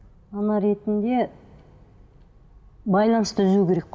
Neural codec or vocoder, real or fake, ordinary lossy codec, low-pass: none; real; none; none